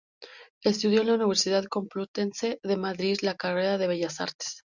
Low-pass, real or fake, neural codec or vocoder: 7.2 kHz; real; none